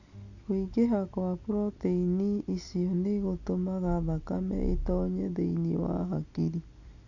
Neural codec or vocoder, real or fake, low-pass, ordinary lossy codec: none; real; 7.2 kHz; none